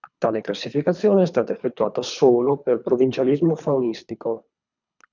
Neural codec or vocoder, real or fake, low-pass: codec, 24 kHz, 3 kbps, HILCodec; fake; 7.2 kHz